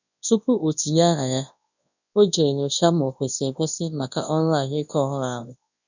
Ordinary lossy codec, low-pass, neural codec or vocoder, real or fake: none; 7.2 kHz; codec, 24 kHz, 0.9 kbps, WavTokenizer, large speech release; fake